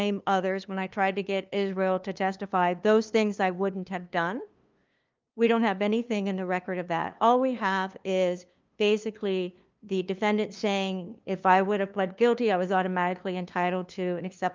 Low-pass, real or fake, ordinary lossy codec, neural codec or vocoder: 7.2 kHz; fake; Opus, 24 kbps; codec, 16 kHz, 2 kbps, FunCodec, trained on LibriTTS, 25 frames a second